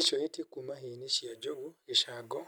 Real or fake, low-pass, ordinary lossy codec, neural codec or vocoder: real; none; none; none